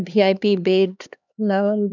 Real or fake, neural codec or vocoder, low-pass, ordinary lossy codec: fake; codec, 16 kHz, 2 kbps, X-Codec, HuBERT features, trained on LibriSpeech; 7.2 kHz; none